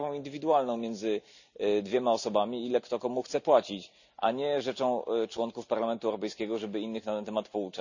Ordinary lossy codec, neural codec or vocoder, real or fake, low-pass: none; none; real; 7.2 kHz